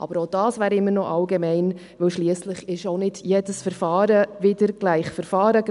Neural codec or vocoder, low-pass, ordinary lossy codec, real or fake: none; 10.8 kHz; none; real